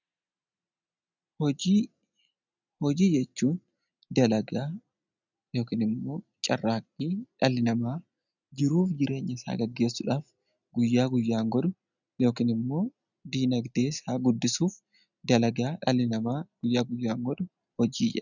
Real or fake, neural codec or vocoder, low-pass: real; none; 7.2 kHz